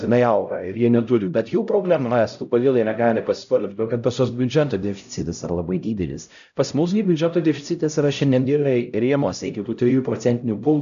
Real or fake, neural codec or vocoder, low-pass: fake; codec, 16 kHz, 0.5 kbps, X-Codec, HuBERT features, trained on LibriSpeech; 7.2 kHz